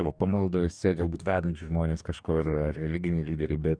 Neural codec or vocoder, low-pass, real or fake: codec, 44.1 kHz, 2.6 kbps, DAC; 9.9 kHz; fake